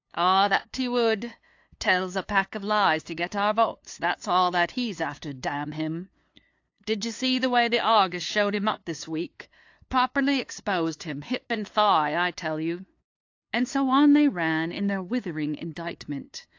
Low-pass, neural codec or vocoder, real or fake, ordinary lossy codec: 7.2 kHz; codec, 16 kHz, 2 kbps, FunCodec, trained on LibriTTS, 25 frames a second; fake; AAC, 48 kbps